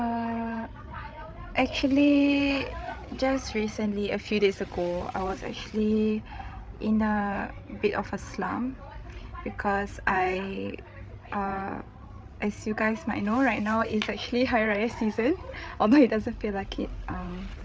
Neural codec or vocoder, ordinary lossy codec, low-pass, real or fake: codec, 16 kHz, 8 kbps, FreqCodec, larger model; none; none; fake